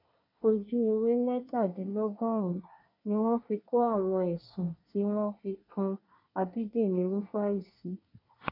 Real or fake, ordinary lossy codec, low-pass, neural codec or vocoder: fake; none; 5.4 kHz; codec, 24 kHz, 1 kbps, SNAC